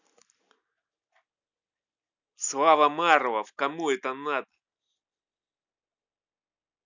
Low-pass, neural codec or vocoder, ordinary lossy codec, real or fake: 7.2 kHz; none; none; real